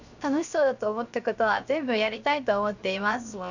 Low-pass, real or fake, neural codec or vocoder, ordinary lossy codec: 7.2 kHz; fake; codec, 16 kHz, 0.7 kbps, FocalCodec; none